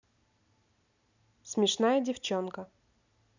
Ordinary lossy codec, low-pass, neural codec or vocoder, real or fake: none; 7.2 kHz; none; real